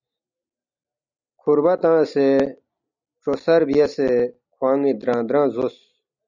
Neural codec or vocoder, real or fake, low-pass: none; real; 7.2 kHz